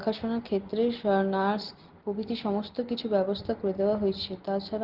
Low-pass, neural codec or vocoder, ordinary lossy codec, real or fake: 5.4 kHz; none; Opus, 16 kbps; real